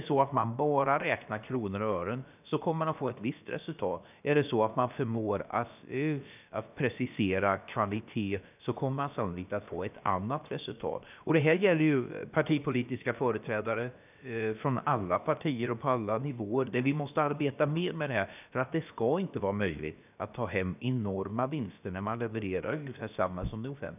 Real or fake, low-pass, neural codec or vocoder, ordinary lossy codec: fake; 3.6 kHz; codec, 16 kHz, about 1 kbps, DyCAST, with the encoder's durations; none